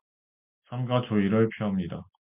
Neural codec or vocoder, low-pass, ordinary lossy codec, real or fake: none; 3.6 kHz; MP3, 32 kbps; real